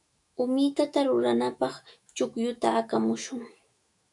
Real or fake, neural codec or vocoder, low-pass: fake; autoencoder, 48 kHz, 128 numbers a frame, DAC-VAE, trained on Japanese speech; 10.8 kHz